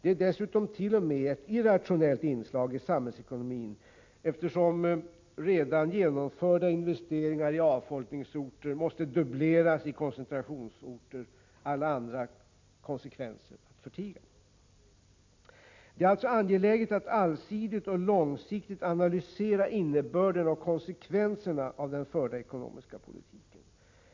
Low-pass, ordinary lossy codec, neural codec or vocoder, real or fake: 7.2 kHz; MP3, 48 kbps; none; real